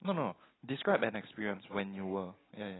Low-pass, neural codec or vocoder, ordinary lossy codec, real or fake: 7.2 kHz; none; AAC, 16 kbps; real